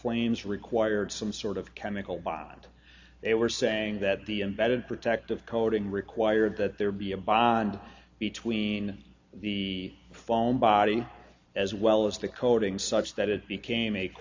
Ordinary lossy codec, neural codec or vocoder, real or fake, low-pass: AAC, 48 kbps; none; real; 7.2 kHz